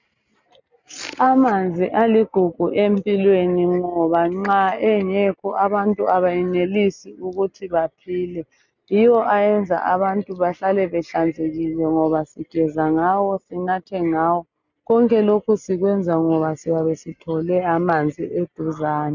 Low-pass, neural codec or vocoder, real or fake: 7.2 kHz; none; real